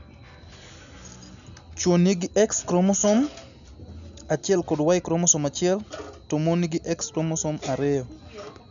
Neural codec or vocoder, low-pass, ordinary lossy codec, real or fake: none; 7.2 kHz; none; real